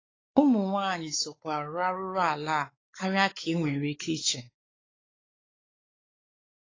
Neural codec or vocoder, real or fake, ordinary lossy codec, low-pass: codec, 16 kHz, 4 kbps, X-Codec, WavLM features, trained on Multilingual LibriSpeech; fake; AAC, 32 kbps; 7.2 kHz